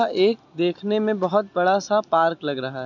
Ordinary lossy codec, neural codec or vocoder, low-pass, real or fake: none; none; 7.2 kHz; real